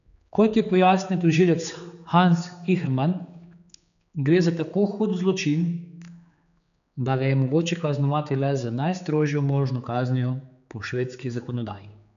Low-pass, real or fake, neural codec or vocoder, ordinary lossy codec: 7.2 kHz; fake; codec, 16 kHz, 4 kbps, X-Codec, HuBERT features, trained on general audio; none